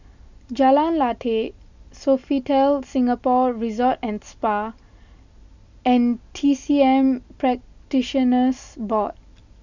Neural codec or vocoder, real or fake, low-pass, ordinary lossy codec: none; real; 7.2 kHz; none